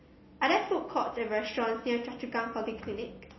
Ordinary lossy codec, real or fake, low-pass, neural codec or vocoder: MP3, 24 kbps; real; 7.2 kHz; none